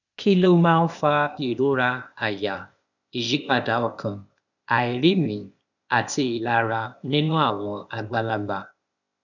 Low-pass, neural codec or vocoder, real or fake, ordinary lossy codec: 7.2 kHz; codec, 16 kHz, 0.8 kbps, ZipCodec; fake; none